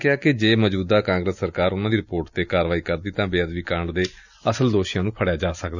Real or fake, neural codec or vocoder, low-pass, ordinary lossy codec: real; none; 7.2 kHz; none